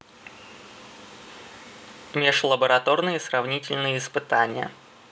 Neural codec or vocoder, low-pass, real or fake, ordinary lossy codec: none; none; real; none